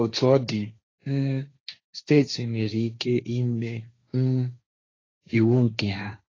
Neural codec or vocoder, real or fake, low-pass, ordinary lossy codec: codec, 16 kHz, 1.1 kbps, Voila-Tokenizer; fake; 7.2 kHz; AAC, 32 kbps